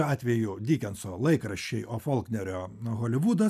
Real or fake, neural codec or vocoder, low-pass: real; none; 14.4 kHz